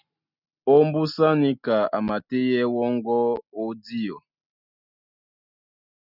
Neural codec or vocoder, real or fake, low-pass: none; real; 5.4 kHz